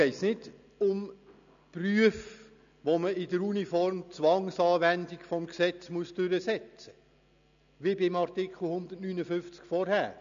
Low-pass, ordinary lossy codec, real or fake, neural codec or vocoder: 7.2 kHz; AAC, 96 kbps; real; none